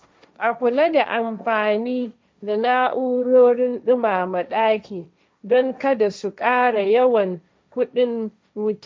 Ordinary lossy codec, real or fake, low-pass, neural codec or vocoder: none; fake; none; codec, 16 kHz, 1.1 kbps, Voila-Tokenizer